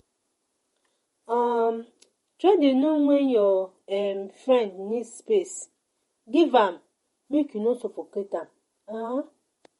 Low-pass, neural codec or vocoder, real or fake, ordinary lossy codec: 19.8 kHz; vocoder, 48 kHz, 128 mel bands, Vocos; fake; MP3, 48 kbps